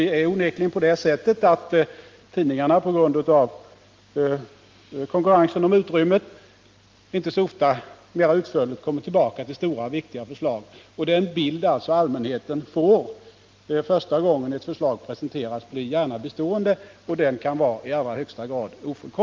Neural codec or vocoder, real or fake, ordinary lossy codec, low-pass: none; real; Opus, 32 kbps; 7.2 kHz